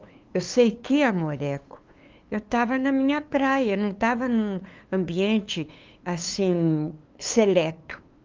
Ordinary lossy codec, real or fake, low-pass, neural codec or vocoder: Opus, 32 kbps; fake; 7.2 kHz; codec, 16 kHz, 2 kbps, FunCodec, trained on LibriTTS, 25 frames a second